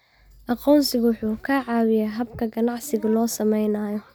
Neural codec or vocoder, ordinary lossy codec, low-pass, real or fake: none; none; none; real